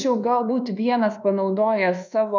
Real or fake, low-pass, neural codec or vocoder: fake; 7.2 kHz; codec, 24 kHz, 1.2 kbps, DualCodec